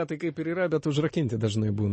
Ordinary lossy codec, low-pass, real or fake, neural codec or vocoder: MP3, 32 kbps; 10.8 kHz; real; none